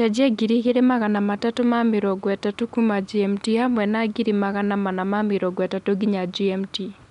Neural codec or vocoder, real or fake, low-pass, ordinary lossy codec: none; real; 10.8 kHz; none